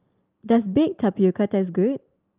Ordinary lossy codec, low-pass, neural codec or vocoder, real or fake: Opus, 24 kbps; 3.6 kHz; none; real